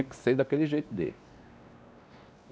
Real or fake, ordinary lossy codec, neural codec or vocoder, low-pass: fake; none; codec, 16 kHz, 2 kbps, X-Codec, WavLM features, trained on Multilingual LibriSpeech; none